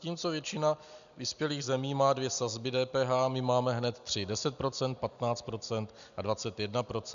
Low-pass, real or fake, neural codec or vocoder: 7.2 kHz; real; none